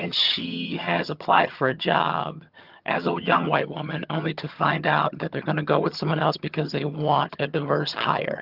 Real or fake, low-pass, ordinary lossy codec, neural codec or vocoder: fake; 5.4 kHz; Opus, 32 kbps; vocoder, 22.05 kHz, 80 mel bands, HiFi-GAN